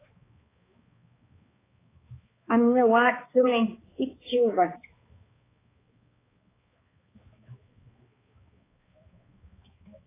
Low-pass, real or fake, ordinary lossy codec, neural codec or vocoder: 3.6 kHz; fake; AAC, 16 kbps; codec, 16 kHz, 2 kbps, X-Codec, HuBERT features, trained on balanced general audio